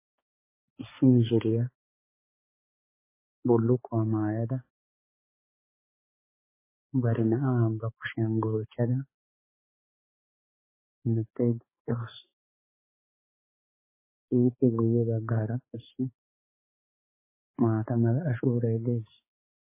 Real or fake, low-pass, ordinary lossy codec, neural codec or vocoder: fake; 3.6 kHz; MP3, 16 kbps; codec, 16 kHz, 4 kbps, X-Codec, HuBERT features, trained on balanced general audio